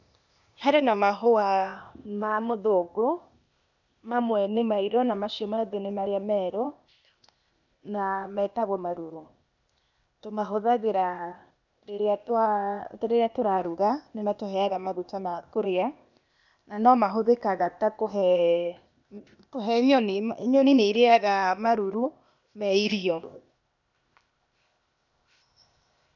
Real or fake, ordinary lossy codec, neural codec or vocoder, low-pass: fake; none; codec, 16 kHz, 0.8 kbps, ZipCodec; 7.2 kHz